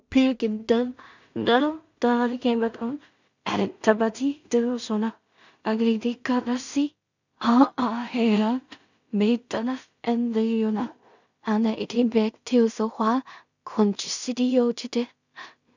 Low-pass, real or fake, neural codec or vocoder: 7.2 kHz; fake; codec, 16 kHz in and 24 kHz out, 0.4 kbps, LongCat-Audio-Codec, two codebook decoder